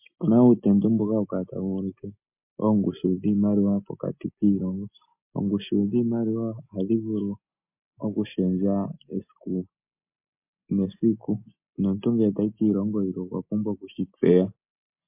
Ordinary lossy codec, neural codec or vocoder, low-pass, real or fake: MP3, 32 kbps; none; 3.6 kHz; real